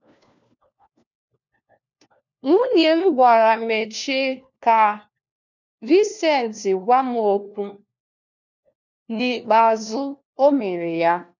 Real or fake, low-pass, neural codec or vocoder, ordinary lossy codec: fake; 7.2 kHz; codec, 16 kHz, 1 kbps, FunCodec, trained on LibriTTS, 50 frames a second; none